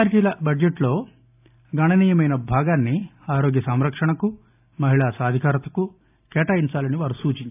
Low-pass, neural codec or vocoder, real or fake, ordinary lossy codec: 3.6 kHz; none; real; AAC, 32 kbps